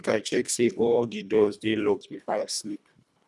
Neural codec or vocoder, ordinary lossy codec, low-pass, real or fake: codec, 24 kHz, 1.5 kbps, HILCodec; none; none; fake